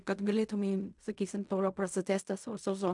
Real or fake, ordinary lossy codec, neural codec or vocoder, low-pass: fake; MP3, 96 kbps; codec, 16 kHz in and 24 kHz out, 0.4 kbps, LongCat-Audio-Codec, fine tuned four codebook decoder; 10.8 kHz